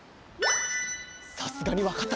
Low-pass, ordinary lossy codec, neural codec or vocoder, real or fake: none; none; none; real